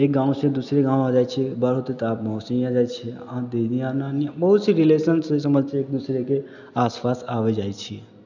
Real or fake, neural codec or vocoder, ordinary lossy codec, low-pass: real; none; none; 7.2 kHz